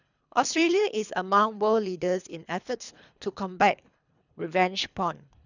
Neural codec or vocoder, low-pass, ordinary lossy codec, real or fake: codec, 24 kHz, 3 kbps, HILCodec; 7.2 kHz; none; fake